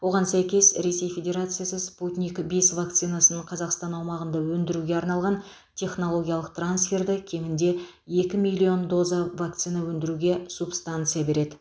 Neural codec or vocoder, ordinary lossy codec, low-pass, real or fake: none; none; none; real